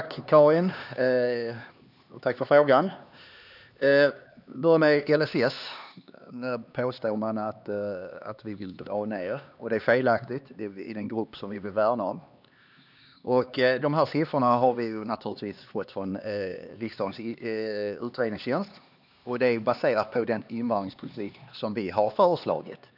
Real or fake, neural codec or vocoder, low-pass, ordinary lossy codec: fake; codec, 16 kHz, 2 kbps, X-Codec, HuBERT features, trained on LibriSpeech; 5.4 kHz; none